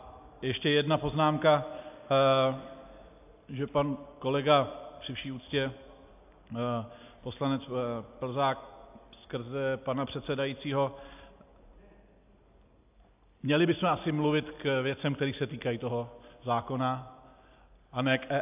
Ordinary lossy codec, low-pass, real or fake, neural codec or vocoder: AAC, 32 kbps; 3.6 kHz; real; none